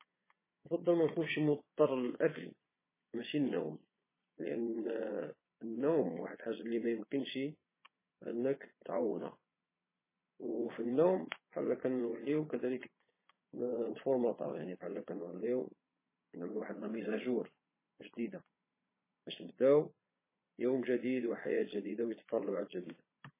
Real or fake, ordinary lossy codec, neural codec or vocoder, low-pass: fake; MP3, 16 kbps; vocoder, 22.05 kHz, 80 mel bands, Vocos; 3.6 kHz